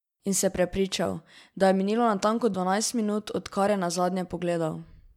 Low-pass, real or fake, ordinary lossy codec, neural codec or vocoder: 19.8 kHz; real; MP3, 96 kbps; none